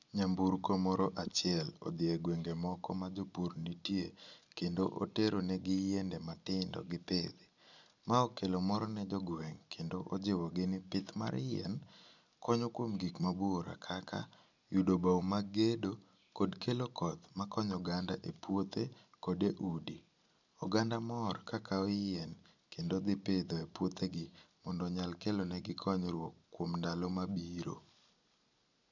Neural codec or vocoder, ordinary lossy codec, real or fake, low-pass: none; none; real; 7.2 kHz